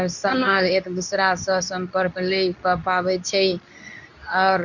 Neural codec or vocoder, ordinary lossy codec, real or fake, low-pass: codec, 24 kHz, 0.9 kbps, WavTokenizer, medium speech release version 2; none; fake; 7.2 kHz